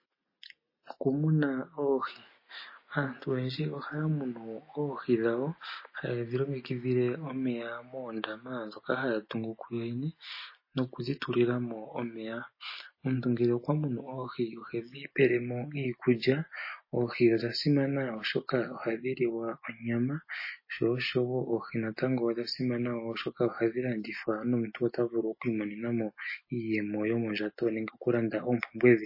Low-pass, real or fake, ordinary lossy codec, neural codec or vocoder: 5.4 kHz; real; MP3, 24 kbps; none